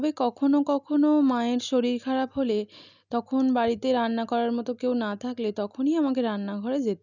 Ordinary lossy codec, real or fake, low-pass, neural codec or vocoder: none; real; 7.2 kHz; none